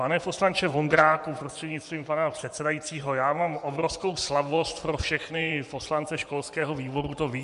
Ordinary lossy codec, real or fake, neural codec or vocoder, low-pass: MP3, 96 kbps; fake; vocoder, 24 kHz, 100 mel bands, Vocos; 10.8 kHz